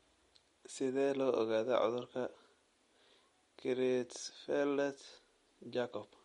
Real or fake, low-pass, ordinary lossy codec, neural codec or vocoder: real; 19.8 kHz; MP3, 48 kbps; none